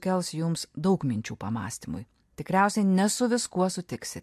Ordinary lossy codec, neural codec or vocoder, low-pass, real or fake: MP3, 64 kbps; none; 14.4 kHz; real